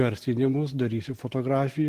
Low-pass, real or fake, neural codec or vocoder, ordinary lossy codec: 14.4 kHz; real; none; Opus, 16 kbps